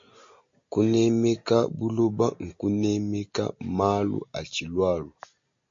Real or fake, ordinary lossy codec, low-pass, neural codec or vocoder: real; MP3, 48 kbps; 7.2 kHz; none